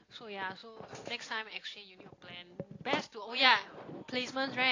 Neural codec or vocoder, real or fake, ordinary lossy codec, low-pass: none; real; AAC, 32 kbps; 7.2 kHz